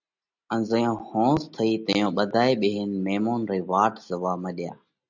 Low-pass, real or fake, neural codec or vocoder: 7.2 kHz; real; none